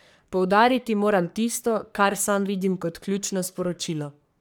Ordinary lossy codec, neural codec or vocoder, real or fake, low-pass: none; codec, 44.1 kHz, 3.4 kbps, Pupu-Codec; fake; none